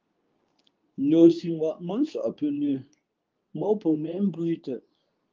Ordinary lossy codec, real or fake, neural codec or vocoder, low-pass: Opus, 24 kbps; fake; codec, 24 kHz, 0.9 kbps, WavTokenizer, medium speech release version 2; 7.2 kHz